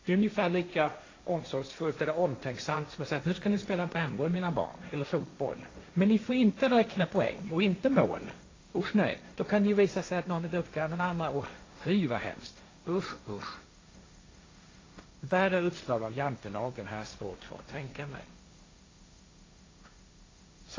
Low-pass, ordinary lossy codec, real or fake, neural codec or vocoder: 7.2 kHz; AAC, 32 kbps; fake; codec, 16 kHz, 1.1 kbps, Voila-Tokenizer